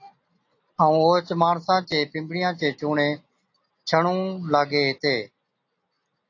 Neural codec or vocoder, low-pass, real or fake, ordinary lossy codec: none; 7.2 kHz; real; AAC, 48 kbps